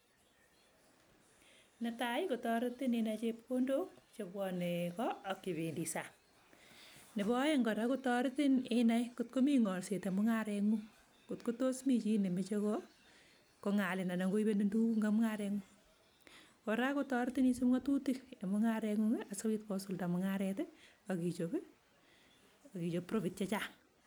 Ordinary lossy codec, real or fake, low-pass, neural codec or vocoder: none; real; none; none